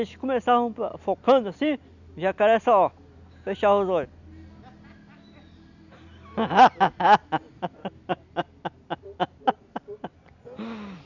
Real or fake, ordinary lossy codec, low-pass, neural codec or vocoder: real; none; 7.2 kHz; none